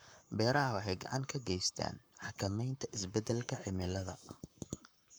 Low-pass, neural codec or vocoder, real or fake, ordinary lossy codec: none; codec, 44.1 kHz, 7.8 kbps, Pupu-Codec; fake; none